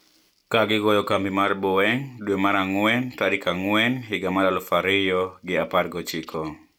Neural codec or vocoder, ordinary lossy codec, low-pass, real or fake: none; none; 19.8 kHz; real